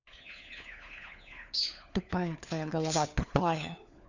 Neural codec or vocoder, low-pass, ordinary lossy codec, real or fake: codec, 16 kHz, 4 kbps, FunCodec, trained on LibriTTS, 50 frames a second; 7.2 kHz; none; fake